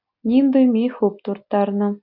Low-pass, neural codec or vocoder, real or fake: 5.4 kHz; none; real